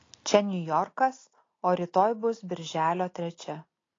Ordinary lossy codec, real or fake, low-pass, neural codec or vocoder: AAC, 32 kbps; real; 7.2 kHz; none